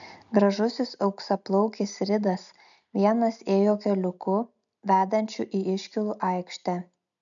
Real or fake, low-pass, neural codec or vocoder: real; 7.2 kHz; none